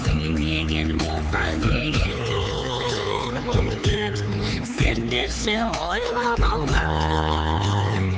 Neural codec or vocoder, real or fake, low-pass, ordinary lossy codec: codec, 16 kHz, 4 kbps, X-Codec, HuBERT features, trained on LibriSpeech; fake; none; none